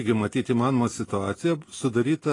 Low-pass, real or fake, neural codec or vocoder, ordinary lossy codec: 10.8 kHz; real; none; AAC, 32 kbps